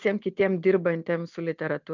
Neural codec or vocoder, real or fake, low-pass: none; real; 7.2 kHz